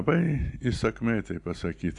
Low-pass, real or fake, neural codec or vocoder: 10.8 kHz; real; none